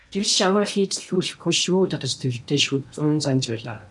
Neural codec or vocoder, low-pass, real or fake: codec, 16 kHz in and 24 kHz out, 0.8 kbps, FocalCodec, streaming, 65536 codes; 10.8 kHz; fake